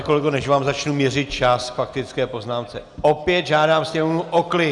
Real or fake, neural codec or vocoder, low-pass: real; none; 10.8 kHz